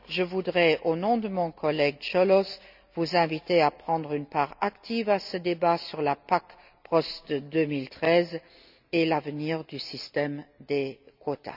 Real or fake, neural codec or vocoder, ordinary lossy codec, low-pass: real; none; none; 5.4 kHz